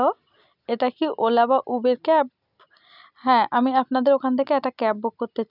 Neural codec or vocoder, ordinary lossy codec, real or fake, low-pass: none; none; real; 5.4 kHz